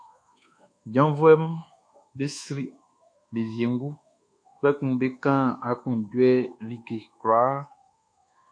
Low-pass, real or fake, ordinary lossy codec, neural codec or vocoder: 9.9 kHz; fake; AAC, 48 kbps; codec, 24 kHz, 1.2 kbps, DualCodec